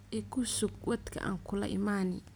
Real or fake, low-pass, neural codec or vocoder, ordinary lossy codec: real; none; none; none